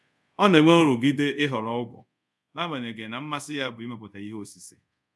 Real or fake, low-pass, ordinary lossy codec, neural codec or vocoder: fake; none; none; codec, 24 kHz, 0.5 kbps, DualCodec